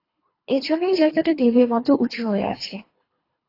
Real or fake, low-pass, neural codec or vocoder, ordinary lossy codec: fake; 5.4 kHz; codec, 24 kHz, 3 kbps, HILCodec; AAC, 24 kbps